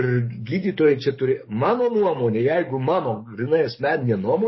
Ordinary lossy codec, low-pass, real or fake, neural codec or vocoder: MP3, 24 kbps; 7.2 kHz; fake; codec, 44.1 kHz, 7.8 kbps, DAC